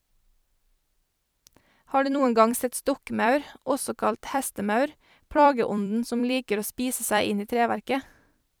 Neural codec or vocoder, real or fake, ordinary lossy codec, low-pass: vocoder, 44.1 kHz, 128 mel bands every 256 samples, BigVGAN v2; fake; none; none